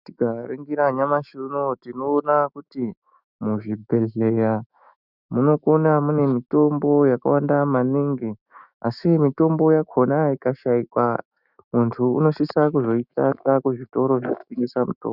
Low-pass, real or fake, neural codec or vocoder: 5.4 kHz; fake; autoencoder, 48 kHz, 128 numbers a frame, DAC-VAE, trained on Japanese speech